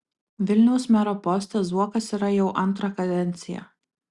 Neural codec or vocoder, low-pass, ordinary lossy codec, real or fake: none; 10.8 kHz; Opus, 64 kbps; real